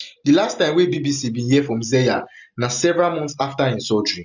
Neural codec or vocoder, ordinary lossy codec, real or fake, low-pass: none; none; real; 7.2 kHz